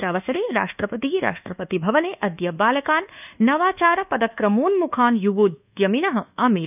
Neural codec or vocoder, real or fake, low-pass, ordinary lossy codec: codec, 24 kHz, 1.2 kbps, DualCodec; fake; 3.6 kHz; none